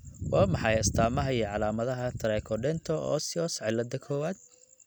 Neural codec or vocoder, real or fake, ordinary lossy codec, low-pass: none; real; none; none